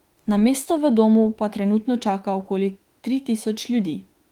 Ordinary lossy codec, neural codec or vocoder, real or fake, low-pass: Opus, 24 kbps; autoencoder, 48 kHz, 32 numbers a frame, DAC-VAE, trained on Japanese speech; fake; 19.8 kHz